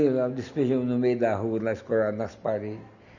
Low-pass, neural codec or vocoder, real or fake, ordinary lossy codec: 7.2 kHz; none; real; none